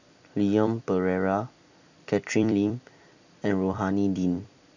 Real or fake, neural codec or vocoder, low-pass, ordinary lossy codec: fake; vocoder, 44.1 kHz, 128 mel bands every 256 samples, BigVGAN v2; 7.2 kHz; none